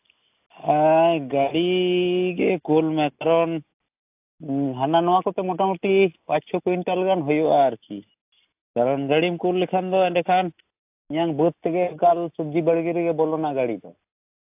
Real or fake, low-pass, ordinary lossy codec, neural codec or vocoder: real; 3.6 kHz; AAC, 32 kbps; none